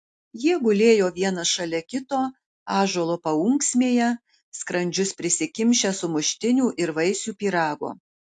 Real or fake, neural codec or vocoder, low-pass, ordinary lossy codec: real; none; 10.8 kHz; AAC, 64 kbps